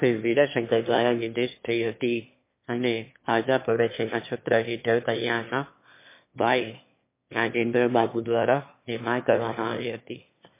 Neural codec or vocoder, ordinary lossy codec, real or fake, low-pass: autoencoder, 22.05 kHz, a latent of 192 numbers a frame, VITS, trained on one speaker; MP3, 24 kbps; fake; 3.6 kHz